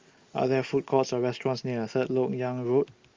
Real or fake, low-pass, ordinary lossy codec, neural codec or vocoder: real; 7.2 kHz; Opus, 32 kbps; none